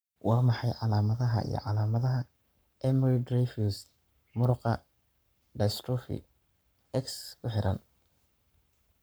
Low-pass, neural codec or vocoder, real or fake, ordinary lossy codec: none; codec, 44.1 kHz, 7.8 kbps, Pupu-Codec; fake; none